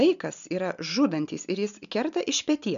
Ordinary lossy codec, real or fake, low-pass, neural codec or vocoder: MP3, 96 kbps; real; 7.2 kHz; none